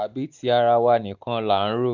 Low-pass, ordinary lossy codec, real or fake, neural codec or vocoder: 7.2 kHz; none; real; none